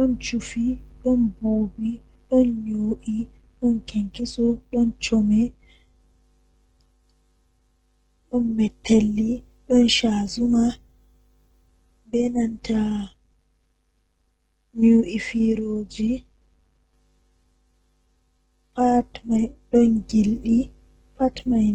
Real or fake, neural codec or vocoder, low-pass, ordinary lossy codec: real; none; 19.8 kHz; Opus, 16 kbps